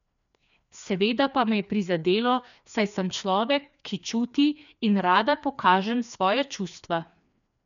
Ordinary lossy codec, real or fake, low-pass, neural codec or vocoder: none; fake; 7.2 kHz; codec, 16 kHz, 2 kbps, FreqCodec, larger model